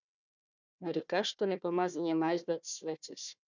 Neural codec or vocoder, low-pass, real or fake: codec, 16 kHz, 1 kbps, FunCodec, trained on Chinese and English, 50 frames a second; 7.2 kHz; fake